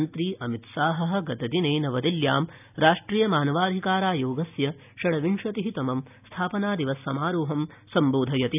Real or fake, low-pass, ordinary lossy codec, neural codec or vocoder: real; 3.6 kHz; none; none